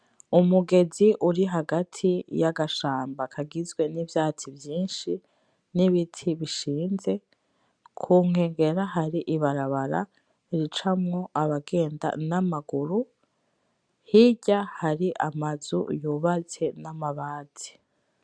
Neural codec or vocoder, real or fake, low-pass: none; real; 9.9 kHz